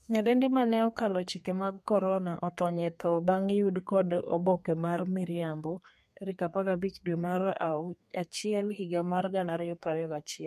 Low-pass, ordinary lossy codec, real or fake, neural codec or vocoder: 14.4 kHz; MP3, 64 kbps; fake; codec, 32 kHz, 1.9 kbps, SNAC